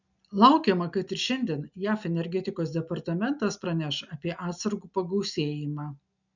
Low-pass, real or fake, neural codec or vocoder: 7.2 kHz; real; none